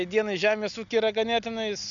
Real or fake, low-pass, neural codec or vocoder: real; 7.2 kHz; none